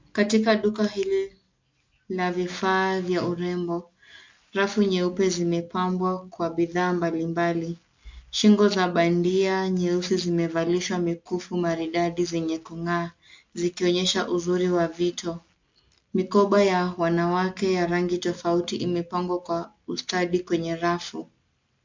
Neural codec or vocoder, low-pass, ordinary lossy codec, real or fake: none; 7.2 kHz; MP3, 48 kbps; real